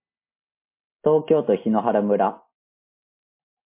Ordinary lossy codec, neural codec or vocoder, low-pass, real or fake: MP3, 24 kbps; none; 3.6 kHz; real